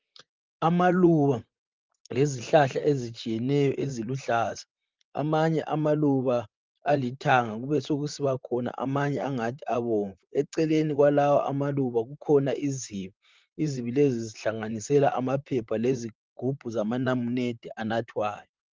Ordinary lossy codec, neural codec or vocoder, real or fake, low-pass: Opus, 24 kbps; vocoder, 44.1 kHz, 128 mel bands, Pupu-Vocoder; fake; 7.2 kHz